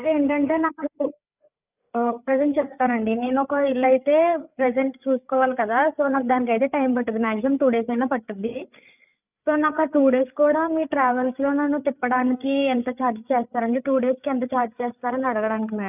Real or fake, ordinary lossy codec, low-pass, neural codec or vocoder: fake; none; 3.6 kHz; codec, 16 kHz, 8 kbps, FreqCodec, larger model